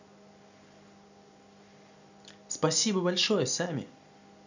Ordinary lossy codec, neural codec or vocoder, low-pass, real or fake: none; none; 7.2 kHz; real